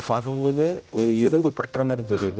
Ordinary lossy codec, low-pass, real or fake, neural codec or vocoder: none; none; fake; codec, 16 kHz, 0.5 kbps, X-Codec, HuBERT features, trained on general audio